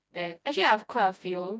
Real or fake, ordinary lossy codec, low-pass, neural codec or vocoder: fake; none; none; codec, 16 kHz, 1 kbps, FreqCodec, smaller model